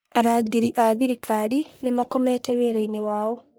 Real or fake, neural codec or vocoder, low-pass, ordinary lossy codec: fake; codec, 44.1 kHz, 1.7 kbps, Pupu-Codec; none; none